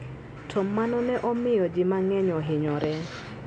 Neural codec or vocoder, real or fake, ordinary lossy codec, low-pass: none; real; none; 9.9 kHz